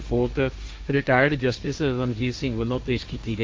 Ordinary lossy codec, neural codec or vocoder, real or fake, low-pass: none; codec, 16 kHz, 1.1 kbps, Voila-Tokenizer; fake; none